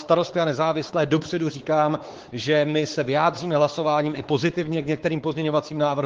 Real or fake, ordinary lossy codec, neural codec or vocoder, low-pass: fake; Opus, 16 kbps; codec, 16 kHz, 4 kbps, X-Codec, WavLM features, trained on Multilingual LibriSpeech; 7.2 kHz